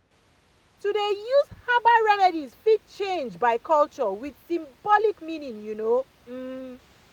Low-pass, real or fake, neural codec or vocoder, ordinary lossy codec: 19.8 kHz; real; none; none